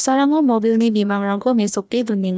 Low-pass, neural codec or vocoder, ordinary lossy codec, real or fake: none; codec, 16 kHz, 1 kbps, FreqCodec, larger model; none; fake